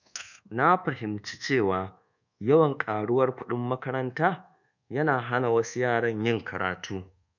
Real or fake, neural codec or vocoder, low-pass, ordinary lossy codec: fake; codec, 24 kHz, 1.2 kbps, DualCodec; 7.2 kHz; none